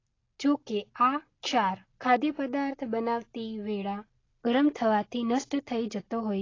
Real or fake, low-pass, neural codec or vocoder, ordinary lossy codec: real; 7.2 kHz; none; AAC, 32 kbps